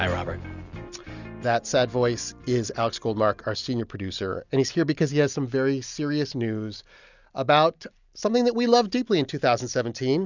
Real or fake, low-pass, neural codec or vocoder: real; 7.2 kHz; none